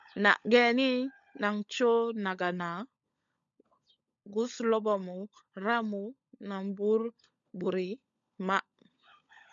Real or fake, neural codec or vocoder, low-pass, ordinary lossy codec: fake; codec, 16 kHz, 16 kbps, FunCodec, trained on LibriTTS, 50 frames a second; 7.2 kHz; MP3, 64 kbps